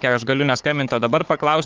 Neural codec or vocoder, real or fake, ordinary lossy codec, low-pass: codec, 16 kHz, 4 kbps, FunCodec, trained on Chinese and English, 50 frames a second; fake; Opus, 32 kbps; 7.2 kHz